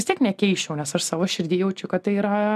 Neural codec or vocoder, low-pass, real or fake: none; 14.4 kHz; real